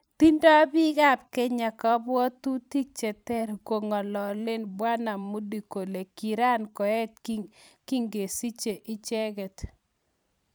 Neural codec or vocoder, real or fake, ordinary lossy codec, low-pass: vocoder, 44.1 kHz, 128 mel bands every 512 samples, BigVGAN v2; fake; none; none